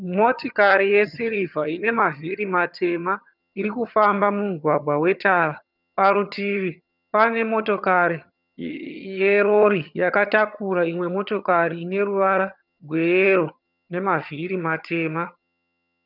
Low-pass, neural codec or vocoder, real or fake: 5.4 kHz; vocoder, 22.05 kHz, 80 mel bands, HiFi-GAN; fake